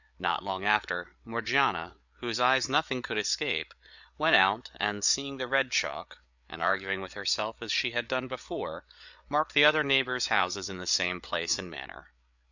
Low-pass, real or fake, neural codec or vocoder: 7.2 kHz; fake; codec, 16 kHz, 8 kbps, FreqCodec, larger model